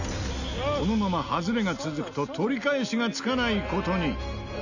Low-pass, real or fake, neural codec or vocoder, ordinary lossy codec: 7.2 kHz; real; none; none